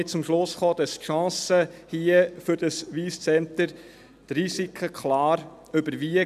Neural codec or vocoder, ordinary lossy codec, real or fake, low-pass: none; none; real; 14.4 kHz